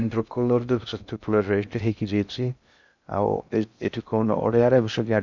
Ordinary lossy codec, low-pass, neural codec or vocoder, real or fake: none; 7.2 kHz; codec, 16 kHz in and 24 kHz out, 0.6 kbps, FocalCodec, streaming, 2048 codes; fake